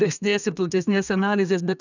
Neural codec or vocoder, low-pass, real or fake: codec, 32 kHz, 1.9 kbps, SNAC; 7.2 kHz; fake